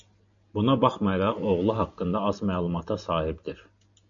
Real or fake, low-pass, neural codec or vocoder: real; 7.2 kHz; none